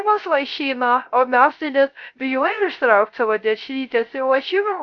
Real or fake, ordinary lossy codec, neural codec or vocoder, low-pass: fake; MP3, 64 kbps; codec, 16 kHz, 0.3 kbps, FocalCodec; 7.2 kHz